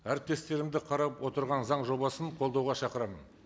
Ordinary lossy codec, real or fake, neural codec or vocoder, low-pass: none; real; none; none